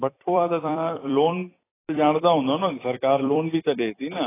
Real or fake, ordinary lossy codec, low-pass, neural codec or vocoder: fake; AAC, 16 kbps; 3.6 kHz; vocoder, 44.1 kHz, 128 mel bands every 256 samples, BigVGAN v2